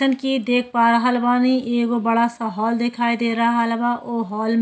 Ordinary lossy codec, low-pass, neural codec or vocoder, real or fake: none; none; none; real